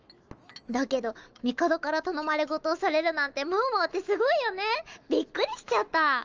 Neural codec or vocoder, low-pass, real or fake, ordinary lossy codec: autoencoder, 48 kHz, 128 numbers a frame, DAC-VAE, trained on Japanese speech; 7.2 kHz; fake; Opus, 24 kbps